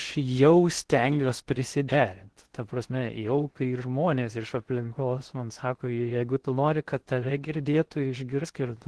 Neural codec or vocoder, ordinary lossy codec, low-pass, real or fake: codec, 16 kHz in and 24 kHz out, 0.6 kbps, FocalCodec, streaming, 4096 codes; Opus, 16 kbps; 10.8 kHz; fake